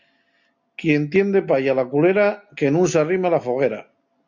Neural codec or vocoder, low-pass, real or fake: none; 7.2 kHz; real